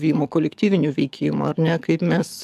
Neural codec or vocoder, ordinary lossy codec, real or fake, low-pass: codec, 44.1 kHz, 7.8 kbps, DAC; AAC, 96 kbps; fake; 14.4 kHz